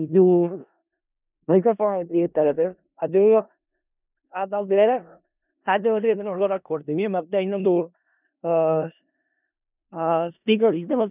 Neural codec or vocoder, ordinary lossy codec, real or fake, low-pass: codec, 16 kHz in and 24 kHz out, 0.4 kbps, LongCat-Audio-Codec, four codebook decoder; none; fake; 3.6 kHz